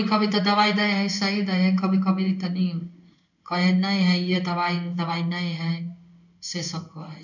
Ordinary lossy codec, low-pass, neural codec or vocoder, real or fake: none; 7.2 kHz; codec, 16 kHz in and 24 kHz out, 1 kbps, XY-Tokenizer; fake